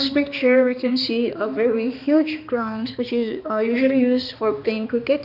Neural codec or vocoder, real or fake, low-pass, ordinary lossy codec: codec, 16 kHz, 2 kbps, X-Codec, HuBERT features, trained on balanced general audio; fake; 5.4 kHz; none